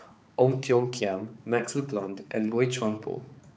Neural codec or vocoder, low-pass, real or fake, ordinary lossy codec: codec, 16 kHz, 4 kbps, X-Codec, HuBERT features, trained on balanced general audio; none; fake; none